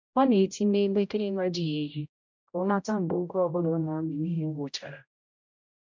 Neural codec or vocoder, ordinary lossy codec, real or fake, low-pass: codec, 16 kHz, 0.5 kbps, X-Codec, HuBERT features, trained on general audio; MP3, 64 kbps; fake; 7.2 kHz